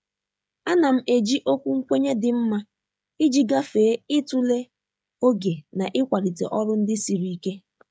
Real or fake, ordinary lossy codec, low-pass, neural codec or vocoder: fake; none; none; codec, 16 kHz, 16 kbps, FreqCodec, smaller model